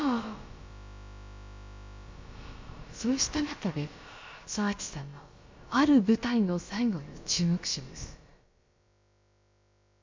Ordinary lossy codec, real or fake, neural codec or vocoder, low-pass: MP3, 64 kbps; fake; codec, 16 kHz, about 1 kbps, DyCAST, with the encoder's durations; 7.2 kHz